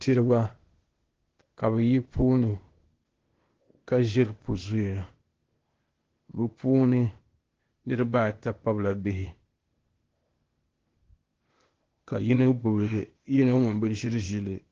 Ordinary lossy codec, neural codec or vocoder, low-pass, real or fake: Opus, 16 kbps; codec, 16 kHz, 0.8 kbps, ZipCodec; 7.2 kHz; fake